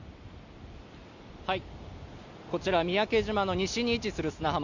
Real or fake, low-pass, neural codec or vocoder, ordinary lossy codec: real; 7.2 kHz; none; none